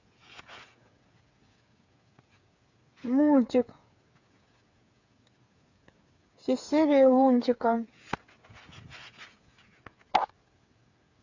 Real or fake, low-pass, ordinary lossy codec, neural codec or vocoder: fake; 7.2 kHz; AAC, 48 kbps; codec, 16 kHz, 8 kbps, FreqCodec, smaller model